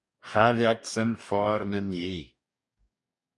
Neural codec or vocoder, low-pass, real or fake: codec, 44.1 kHz, 2.6 kbps, DAC; 10.8 kHz; fake